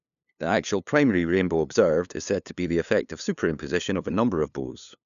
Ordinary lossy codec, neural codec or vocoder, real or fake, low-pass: none; codec, 16 kHz, 2 kbps, FunCodec, trained on LibriTTS, 25 frames a second; fake; 7.2 kHz